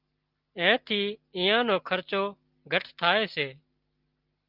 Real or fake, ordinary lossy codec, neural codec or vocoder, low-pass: real; Opus, 24 kbps; none; 5.4 kHz